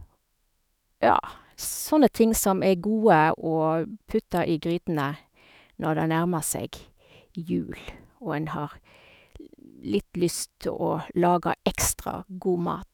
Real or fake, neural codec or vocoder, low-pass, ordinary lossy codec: fake; autoencoder, 48 kHz, 128 numbers a frame, DAC-VAE, trained on Japanese speech; none; none